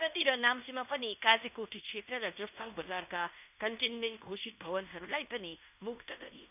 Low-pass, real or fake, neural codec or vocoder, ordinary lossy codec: 3.6 kHz; fake; codec, 16 kHz in and 24 kHz out, 0.9 kbps, LongCat-Audio-Codec, fine tuned four codebook decoder; none